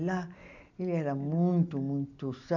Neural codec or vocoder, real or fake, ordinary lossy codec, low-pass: none; real; none; 7.2 kHz